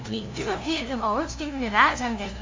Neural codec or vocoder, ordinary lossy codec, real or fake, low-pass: codec, 16 kHz, 0.5 kbps, FunCodec, trained on LibriTTS, 25 frames a second; MP3, 64 kbps; fake; 7.2 kHz